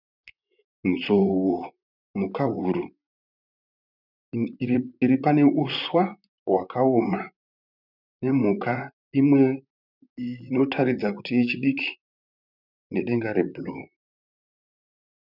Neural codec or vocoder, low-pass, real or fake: vocoder, 44.1 kHz, 128 mel bands, Pupu-Vocoder; 5.4 kHz; fake